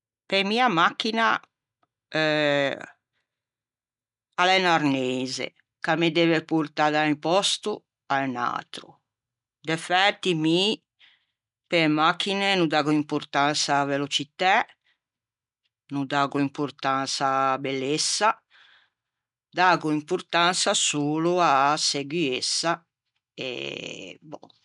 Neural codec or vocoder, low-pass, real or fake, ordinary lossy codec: none; 10.8 kHz; real; none